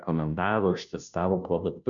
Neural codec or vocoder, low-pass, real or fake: codec, 16 kHz, 0.5 kbps, FunCodec, trained on Chinese and English, 25 frames a second; 7.2 kHz; fake